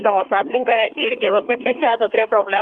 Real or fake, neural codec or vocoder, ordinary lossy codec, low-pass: fake; codec, 24 kHz, 1 kbps, SNAC; Opus, 32 kbps; 9.9 kHz